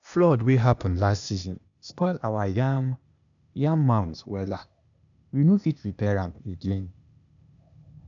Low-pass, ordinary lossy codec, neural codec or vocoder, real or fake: 7.2 kHz; none; codec, 16 kHz, 0.8 kbps, ZipCodec; fake